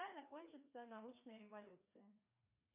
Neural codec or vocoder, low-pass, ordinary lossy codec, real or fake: codec, 16 kHz, 2 kbps, FreqCodec, larger model; 3.6 kHz; MP3, 32 kbps; fake